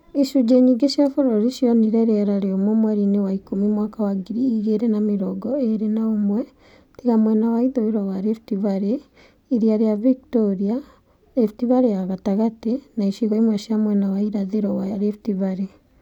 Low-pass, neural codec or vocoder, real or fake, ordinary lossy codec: 19.8 kHz; none; real; none